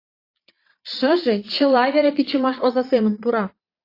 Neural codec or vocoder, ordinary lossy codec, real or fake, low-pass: vocoder, 22.05 kHz, 80 mel bands, Vocos; AAC, 32 kbps; fake; 5.4 kHz